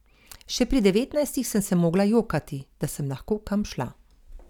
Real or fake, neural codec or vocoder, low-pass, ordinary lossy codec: real; none; 19.8 kHz; none